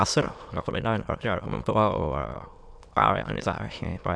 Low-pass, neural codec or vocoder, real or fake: 9.9 kHz; autoencoder, 22.05 kHz, a latent of 192 numbers a frame, VITS, trained on many speakers; fake